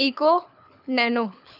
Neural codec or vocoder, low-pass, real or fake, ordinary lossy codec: codec, 24 kHz, 6 kbps, HILCodec; 5.4 kHz; fake; none